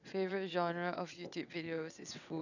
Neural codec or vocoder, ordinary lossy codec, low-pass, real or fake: vocoder, 22.05 kHz, 80 mel bands, WaveNeXt; none; 7.2 kHz; fake